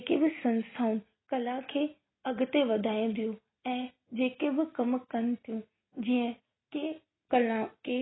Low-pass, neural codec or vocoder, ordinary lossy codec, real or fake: 7.2 kHz; none; AAC, 16 kbps; real